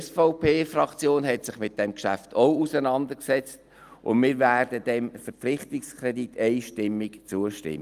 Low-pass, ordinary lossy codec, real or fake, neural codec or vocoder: 14.4 kHz; Opus, 32 kbps; real; none